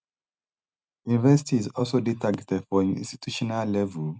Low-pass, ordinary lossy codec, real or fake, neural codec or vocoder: none; none; real; none